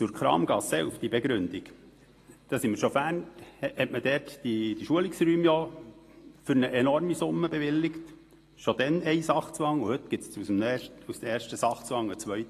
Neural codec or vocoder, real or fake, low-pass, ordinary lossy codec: none; real; 14.4 kHz; AAC, 48 kbps